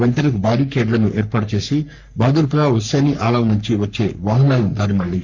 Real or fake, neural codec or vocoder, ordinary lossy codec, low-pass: fake; codec, 44.1 kHz, 3.4 kbps, Pupu-Codec; none; 7.2 kHz